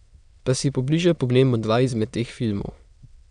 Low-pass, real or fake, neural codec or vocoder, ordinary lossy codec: 9.9 kHz; fake; autoencoder, 22.05 kHz, a latent of 192 numbers a frame, VITS, trained on many speakers; none